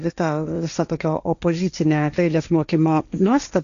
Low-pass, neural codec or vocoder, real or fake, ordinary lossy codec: 7.2 kHz; codec, 16 kHz, 1.1 kbps, Voila-Tokenizer; fake; AAC, 96 kbps